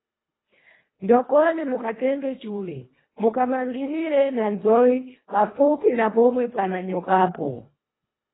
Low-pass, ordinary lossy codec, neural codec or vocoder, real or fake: 7.2 kHz; AAC, 16 kbps; codec, 24 kHz, 1.5 kbps, HILCodec; fake